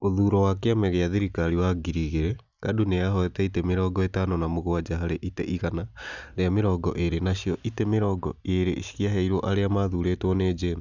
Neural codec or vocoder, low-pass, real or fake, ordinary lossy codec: none; 7.2 kHz; real; none